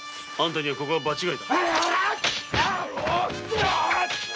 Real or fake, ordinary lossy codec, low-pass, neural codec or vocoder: real; none; none; none